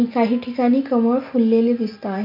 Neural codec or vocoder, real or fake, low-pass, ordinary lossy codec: none; real; 5.4 kHz; MP3, 48 kbps